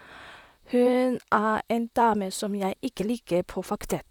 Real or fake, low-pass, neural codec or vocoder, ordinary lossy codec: fake; 19.8 kHz; vocoder, 44.1 kHz, 128 mel bands, Pupu-Vocoder; none